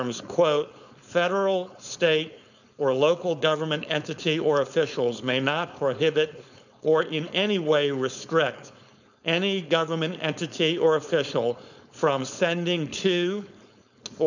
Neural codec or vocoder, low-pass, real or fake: codec, 16 kHz, 4.8 kbps, FACodec; 7.2 kHz; fake